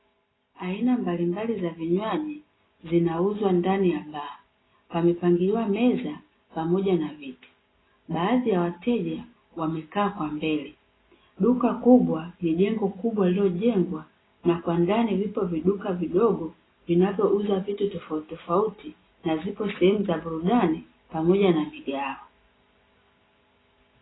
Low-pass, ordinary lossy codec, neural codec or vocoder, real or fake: 7.2 kHz; AAC, 16 kbps; none; real